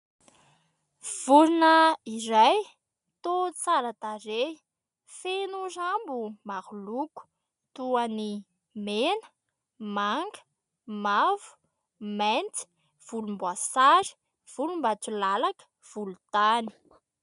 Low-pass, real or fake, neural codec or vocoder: 10.8 kHz; real; none